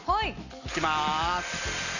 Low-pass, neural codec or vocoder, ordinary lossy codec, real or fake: 7.2 kHz; none; none; real